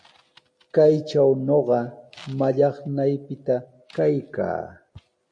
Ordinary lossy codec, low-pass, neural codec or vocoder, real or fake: MP3, 48 kbps; 9.9 kHz; none; real